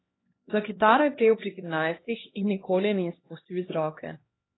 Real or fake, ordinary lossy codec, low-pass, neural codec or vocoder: fake; AAC, 16 kbps; 7.2 kHz; codec, 16 kHz, 1 kbps, X-Codec, HuBERT features, trained on LibriSpeech